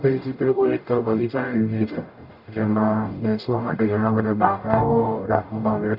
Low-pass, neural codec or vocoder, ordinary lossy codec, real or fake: 5.4 kHz; codec, 44.1 kHz, 0.9 kbps, DAC; AAC, 48 kbps; fake